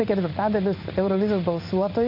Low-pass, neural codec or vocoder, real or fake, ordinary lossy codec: 5.4 kHz; codec, 16 kHz in and 24 kHz out, 1 kbps, XY-Tokenizer; fake; MP3, 32 kbps